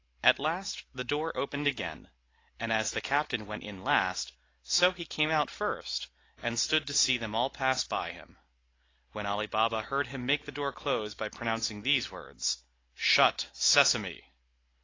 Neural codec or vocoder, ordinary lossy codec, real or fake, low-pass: none; AAC, 32 kbps; real; 7.2 kHz